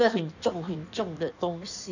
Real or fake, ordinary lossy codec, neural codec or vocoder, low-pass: fake; none; codec, 16 kHz in and 24 kHz out, 1.1 kbps, FireRedTTS-2 codec; 7.2 kHz